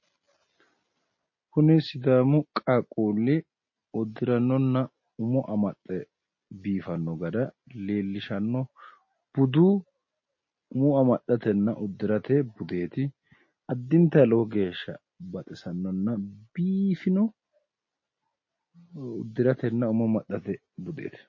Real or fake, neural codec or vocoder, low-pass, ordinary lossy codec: real; none; 7.2 kHz; MP3, 32 kbps